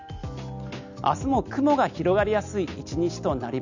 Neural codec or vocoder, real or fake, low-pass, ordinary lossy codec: none; real; 7.2 kHz; none